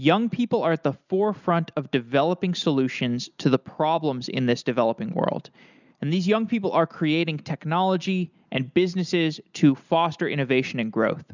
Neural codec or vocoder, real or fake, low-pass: none; real; 7.2 kHz